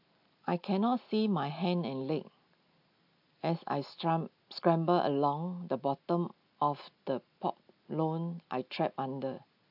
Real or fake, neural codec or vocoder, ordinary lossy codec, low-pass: real; none; AAC, 48 kbps; 5.4 kHz